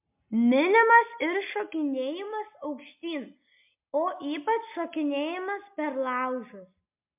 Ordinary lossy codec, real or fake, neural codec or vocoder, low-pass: MP3, 32 kbps; real; none; 3.6 kHz